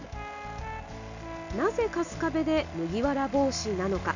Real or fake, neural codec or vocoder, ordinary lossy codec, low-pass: real; none; none; 7.2 kHz